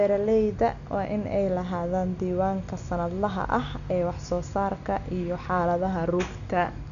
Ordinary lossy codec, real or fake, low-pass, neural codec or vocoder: none; real; 7.2 kHz; none